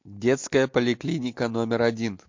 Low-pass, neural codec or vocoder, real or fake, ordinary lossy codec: 7.2 kHz; none; real; MP3, 48 kbps